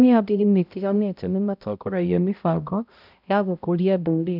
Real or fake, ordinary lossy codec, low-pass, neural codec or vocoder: fake; none; 5.4 kHz; codec, 16 kHz, 0.5 kbps, X-Codec, HuBERT features, trained on balanced general audio